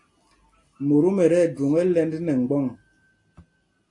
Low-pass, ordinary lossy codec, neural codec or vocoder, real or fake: 10.8 kHz; AAC, 48 kbps; none; real